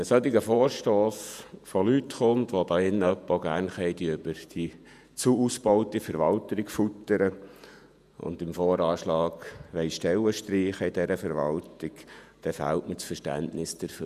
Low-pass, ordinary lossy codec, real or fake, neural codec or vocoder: 14.4 kHz; none; fake; vocoder, 48 kHz, 128 mel bands, Vocos